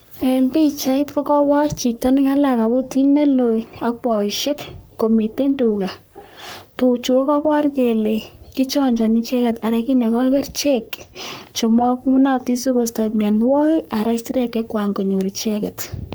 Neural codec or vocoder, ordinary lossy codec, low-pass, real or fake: codec, 44.1 kHz, 3.4 kbps, Pupu-Codec; none; none; fake